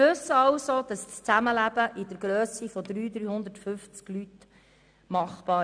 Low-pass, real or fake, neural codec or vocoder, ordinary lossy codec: 9.9 kHz; real; none; none